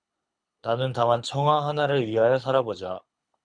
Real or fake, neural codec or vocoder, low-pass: fake; codec, 24 kHz, 6 kbps, HILCodec; 9.9 kHz